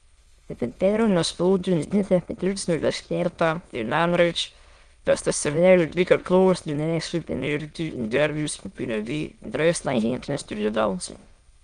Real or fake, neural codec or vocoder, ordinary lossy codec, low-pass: fake; autoencoder, 22.05 kHz, a latent of 192 numbers a frame, VITS, trained on many speakers; Opus, 32 kbps; 9.9 kHz